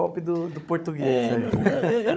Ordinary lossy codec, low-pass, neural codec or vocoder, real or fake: none; none; codec, 16 kHz, 16 kbps, FunCodec, trained on Chinese and English, 50 frames a second; fake